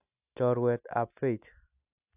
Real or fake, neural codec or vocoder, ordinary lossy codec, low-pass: real; none; AAC, 32 kbps; 3.6 kHz